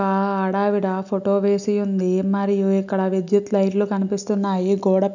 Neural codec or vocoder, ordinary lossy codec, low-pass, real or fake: none; none; 7.2 kHz; real